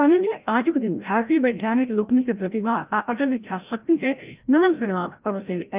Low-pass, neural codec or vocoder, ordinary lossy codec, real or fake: 3.6 kHz; codec, 16 kHz, 0.5 kbps, FreqCodec, larger model; Opus, 32 kbps; fake